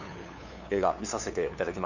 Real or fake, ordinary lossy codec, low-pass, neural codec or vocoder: fake; none; 7.2 kHz; codec, 16 kHz, 2 kbps, FunCodec, trained on LibriTTS, 25 frames a second